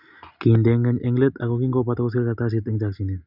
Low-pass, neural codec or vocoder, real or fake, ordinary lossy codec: 5.4 kHz; none; real; none